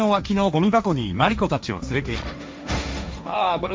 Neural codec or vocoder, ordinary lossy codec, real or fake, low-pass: codec, 16 kHz, 1.1 kbps, Voila-Tokenizer; none; fake; none